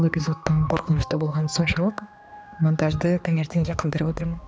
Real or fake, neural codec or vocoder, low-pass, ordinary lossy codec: fake; codec, 16 kHz, 2 kbps, X-Codec, HuBERT features, trained on balanced general audio; none; none